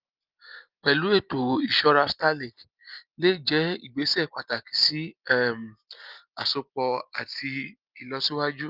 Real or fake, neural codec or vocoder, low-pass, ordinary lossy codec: real; none; 5.4 kHz; Opus, 24 kbps